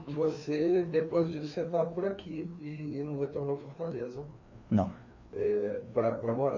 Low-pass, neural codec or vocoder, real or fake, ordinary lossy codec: 7.2 kHz; codec, 16 kHz, 2 kbps, FreqCodec, larger model; fake; MP3, 48 kbps